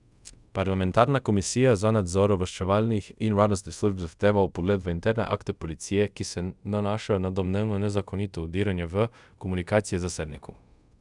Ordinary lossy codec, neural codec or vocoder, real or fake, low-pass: none; codec, 24 kHz, 0.5 kbps, DualCodec; fake; 10.8 kHz